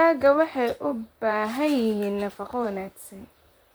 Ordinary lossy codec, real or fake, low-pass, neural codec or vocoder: none; fake; none; vocoder, 44.1 kHz, 128 mel bands, Pupu-Vocoder